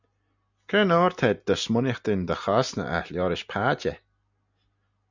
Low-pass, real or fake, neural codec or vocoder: 7.2 kHz; real; none